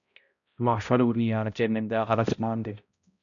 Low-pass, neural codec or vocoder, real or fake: 7.2 kHz; codec, 16 kHz, 0.5 kbps, X-Codec, HuBERT features, trained on balanced general audio; fake